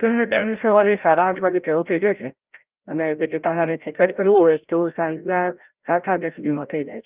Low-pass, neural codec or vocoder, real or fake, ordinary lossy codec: 3.6 kHz; codec, 16 kHz, 0.5 kbps, FreqCodec, larger model; fake; Opus, 32 kbps